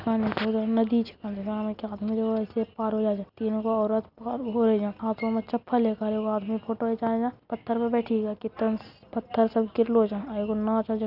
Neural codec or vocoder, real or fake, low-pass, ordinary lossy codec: none; real; 5.4 kHz; none